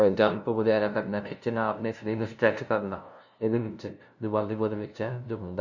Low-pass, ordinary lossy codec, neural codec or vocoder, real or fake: 7.2 kHz; none; codec, 16 kHz, 0.5 kbps, FunCodec, trained on LibriTTS, 25 frames a second; fake